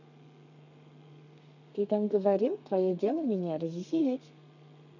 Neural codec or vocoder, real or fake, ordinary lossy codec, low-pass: codec, 32 kHz, 1.9 kbps, SNAC; fake; none; 7.2 kHz